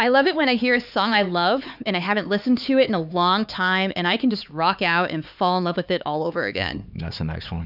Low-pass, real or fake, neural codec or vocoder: 5.4 kHz; fake; codec, 16 kHz, 4 kbps, X-Codec, HuBERT features, trained on LibriSpeech